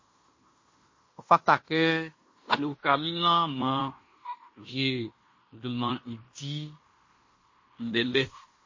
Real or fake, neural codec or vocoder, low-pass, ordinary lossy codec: fake; codec, 16 kHz in and 24 kHz out, 0.9 kbps, LongCat-Audio-Codec, fine tuned four codebook decoder; 7.2 kHz; MP3, 32 kbps